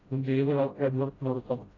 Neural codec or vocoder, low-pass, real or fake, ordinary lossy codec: codec, 16 kHz, 0.5 kbps, FreqCodec, smaller model; 7.2 kHz; fake; AAC, 32 kbps